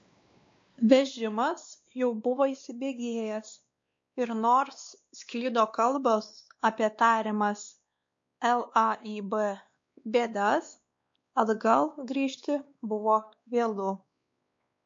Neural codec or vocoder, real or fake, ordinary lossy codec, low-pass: codec, 16 kHz, 2 kbps, X-Codec, WavLM features, trained on Multilingual LibriSpeech; fake; MP3, 48 kbps; 7.2 kHz